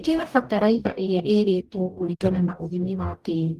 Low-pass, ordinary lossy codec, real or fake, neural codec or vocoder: 14.4 kHz; Opus, 24 kbps; fake; codec, 44.1 kHz, 0.9 kbps, DAC